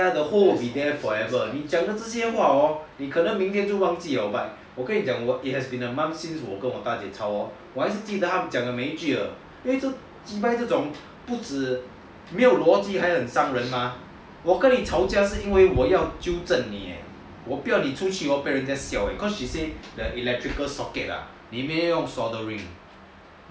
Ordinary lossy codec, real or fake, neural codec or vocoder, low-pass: none; real; none; none